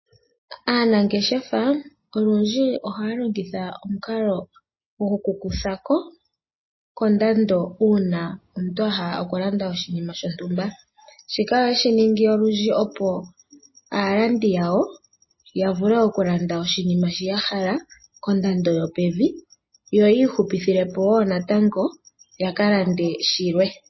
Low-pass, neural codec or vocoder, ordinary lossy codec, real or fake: 7.2 kHz; none; MP3, 24 kbps; real